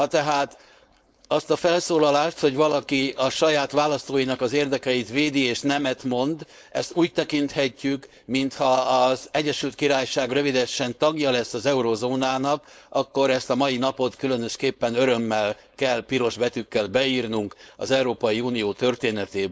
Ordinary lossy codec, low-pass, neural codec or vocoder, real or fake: none; none; codec, 16 kHz, 4.8 kbps, FACodec; fake